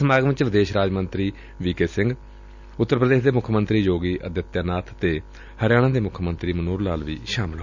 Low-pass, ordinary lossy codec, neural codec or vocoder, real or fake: 7.2 kHz; none; none; real